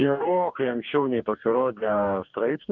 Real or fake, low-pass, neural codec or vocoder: fake; 7.2 kHz; codec, 44.1 kHz, 2.6 kbps, DAC